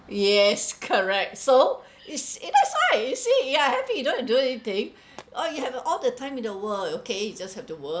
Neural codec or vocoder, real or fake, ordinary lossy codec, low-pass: none; real; none; none